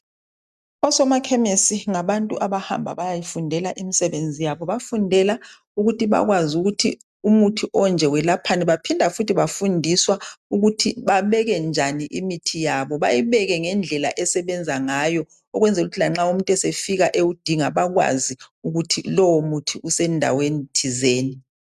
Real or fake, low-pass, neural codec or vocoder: real; 14.4 kHz; none